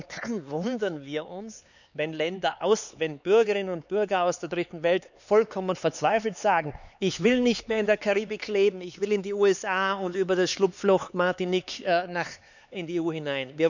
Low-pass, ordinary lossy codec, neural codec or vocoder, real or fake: 7.2 kHz; none; codec, 16 kHz, 4 kbps, X-Codec, HuBERT features, trained on LibriSpeech; fake